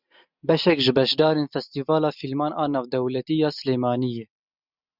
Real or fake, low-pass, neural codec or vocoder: real; 5.4 kHz; none